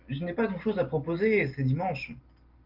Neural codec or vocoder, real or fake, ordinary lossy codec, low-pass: none; real; Opus, 32 kbps; 5.4 kHz